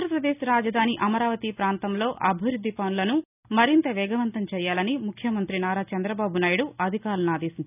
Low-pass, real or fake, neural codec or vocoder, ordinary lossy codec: 3.6 kHz; real; none; none